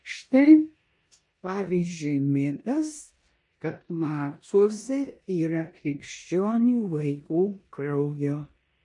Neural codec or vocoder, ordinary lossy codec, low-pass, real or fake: codec, 16 kHz in and 24 kHz out, 0.9 kbps, LongCat-Audio-Codec, four codebook decoder; MP3, 48 kbps; 10.8 kHz; fake